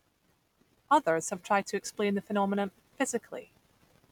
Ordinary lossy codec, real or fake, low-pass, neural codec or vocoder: none; real; 19.8 kHz; none